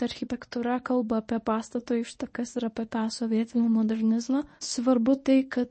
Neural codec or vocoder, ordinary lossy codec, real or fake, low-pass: codec, 24 kHz, 0.9 kbps, WavTokenizer, medium speech release version 1; MP3, 32 kbps; fake; 10.8 kHz